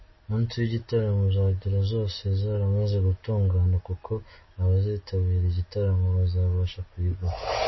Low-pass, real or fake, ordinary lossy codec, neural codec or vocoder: 7.2 kHz; real; MP3, 24 kbps; none